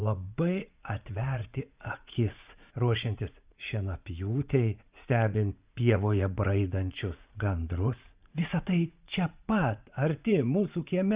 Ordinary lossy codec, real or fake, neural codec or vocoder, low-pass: Opus, 64 kbps; fake; vocoder, 44.1 kHz, 80 mel bands, Vocos; 3.6 kHz